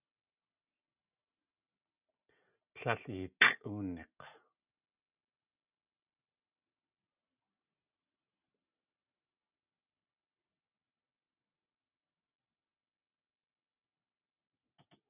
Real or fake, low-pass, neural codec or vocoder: real; 3.6 kHz; none